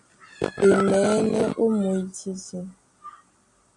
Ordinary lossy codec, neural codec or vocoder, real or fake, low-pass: MP3, 96 kbps; none; real; 10.8 kHz